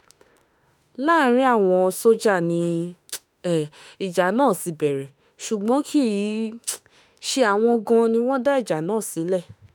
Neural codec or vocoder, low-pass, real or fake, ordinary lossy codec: autoencoder, 48 kHz, 32 numbers a frame, DAC-VAE, trained on Japanese speech; none; fake; none